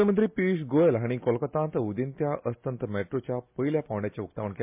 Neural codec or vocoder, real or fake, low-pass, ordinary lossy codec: none; real; 3.6 kHz; MP3, 32 kbps